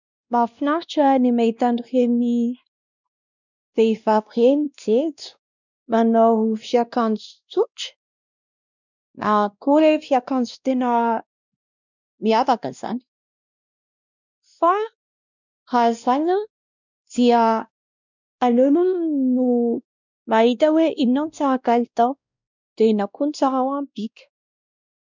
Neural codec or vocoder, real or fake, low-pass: codec, 16 kHz, 1 kbps, X-Codec, WavLM features, trained on Multilingual LibriSpeech; fake; 7.2 kHz